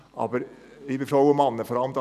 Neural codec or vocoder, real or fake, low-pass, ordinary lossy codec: none; real; 14.4 kHz; none